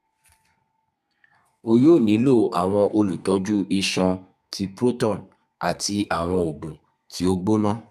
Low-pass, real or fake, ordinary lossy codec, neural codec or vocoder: 14.4 kHz; fake; none; codec, 32 kHz, 1.9 kbps, SNAC